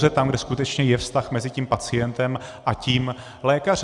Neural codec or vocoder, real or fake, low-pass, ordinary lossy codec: none; real; 10.8 kHz; Opus, 64 kbps